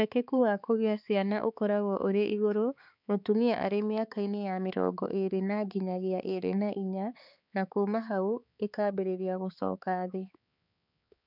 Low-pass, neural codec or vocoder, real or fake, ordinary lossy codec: 5.4 kHz; codec, 16 kHz, 4 kbps, X-Codec, HuBERT features, trained on balanced general audio; fake; none